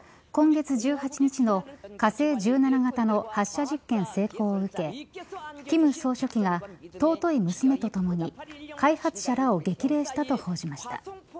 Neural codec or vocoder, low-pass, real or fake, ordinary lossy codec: none; none; real; none